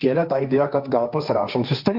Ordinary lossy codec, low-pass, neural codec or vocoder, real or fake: Opus, 64 kbps; 5.4 kHz; codec, 16 kHz, 1.1 kbps, Voila-Tokenizer; fake